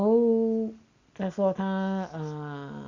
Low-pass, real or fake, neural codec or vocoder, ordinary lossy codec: 7.2 kHz; real; none; Opus, 64 kbps